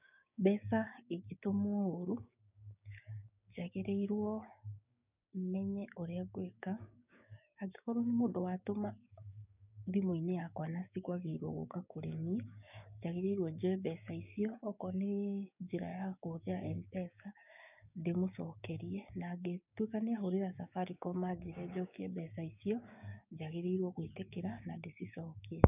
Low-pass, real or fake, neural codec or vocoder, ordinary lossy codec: 3.6 kHz; fake; codec, 16 kHz, 6 kbps, DAC; none